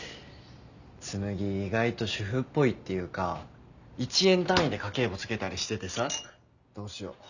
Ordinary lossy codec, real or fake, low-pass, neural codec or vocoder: none; real; 7.2 kHz; none